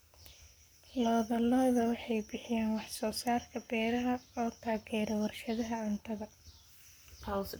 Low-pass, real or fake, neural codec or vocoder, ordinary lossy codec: none; fake; codec, 44.1 kHz, 7.8 kbps, Pupu-Codec; none